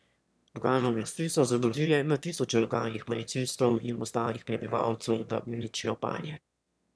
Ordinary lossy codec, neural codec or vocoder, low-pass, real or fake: none; autoencoder, 22.05 kHz, a latent of 192 numbers a frame, VITS, trained on one speaker; none; fake